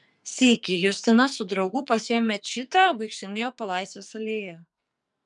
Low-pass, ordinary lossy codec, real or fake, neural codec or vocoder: 10.8 kHz; MP3, 96 kbps; fake; codec, 44.1 kHz, 2.6 kbps, SNAC